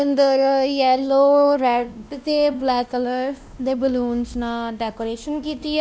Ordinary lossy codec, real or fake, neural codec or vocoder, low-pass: none; fake; codec, 16 kHz, 1 kbps, X-Codec, WavLM features, trained on Multilingual LibriSpeech; none